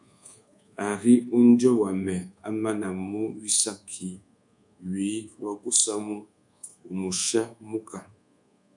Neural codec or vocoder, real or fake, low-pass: codec, 24 kHz, 1.2 kbps, DualCodec; fake; 10.8 kHz